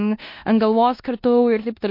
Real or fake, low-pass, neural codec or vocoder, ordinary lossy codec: fake; 5.4 kHz; codec, 16 kHz in and 24 kHz out, 0.9 kbps, LongCat-Audio-Codec, four codebook decoder; MP3, 32 kbps